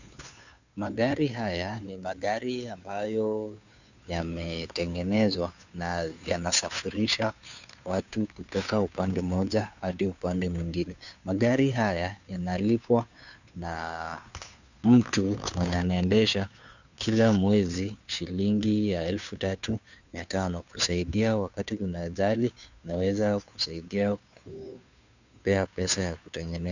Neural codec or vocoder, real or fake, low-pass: codec, 16 kHz, 2 kbps, FunCodec, trained on Chinese and English, 25 frames a second; fake; 7.2 kHz